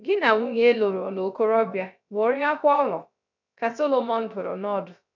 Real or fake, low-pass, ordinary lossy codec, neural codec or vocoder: fake; 7.2 kHz; none; codec, 16 kHz, 0.3 kbps, FocalCodec